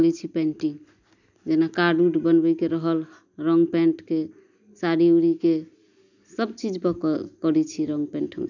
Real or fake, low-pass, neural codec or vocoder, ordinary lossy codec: real; 7.2 kHz; none; none